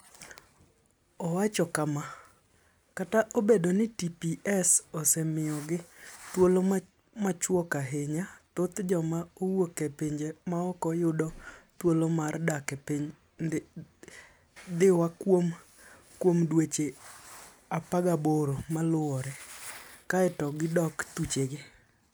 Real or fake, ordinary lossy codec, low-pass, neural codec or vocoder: real; none; none; none